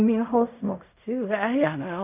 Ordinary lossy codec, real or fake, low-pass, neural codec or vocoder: none; fake; 3.6 kHz; codec, 16 kHz in and 24 kHz out, 0.4 kbps, LongCat-Audio-Codec, fine tuned four codebook decoder